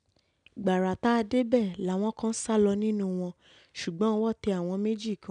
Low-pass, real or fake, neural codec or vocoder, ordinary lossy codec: 9.9 kHz; real; none; MP3, 96 kbps